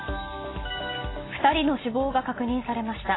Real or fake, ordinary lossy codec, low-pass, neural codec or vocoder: real; AAC, 16 kbps; 7.2 kHz; none